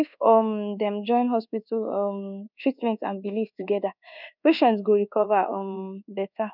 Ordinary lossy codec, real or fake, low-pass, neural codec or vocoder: none; fake; 5.4 kHz; codec, 24 kHz, 1.2 kbps, DualCodec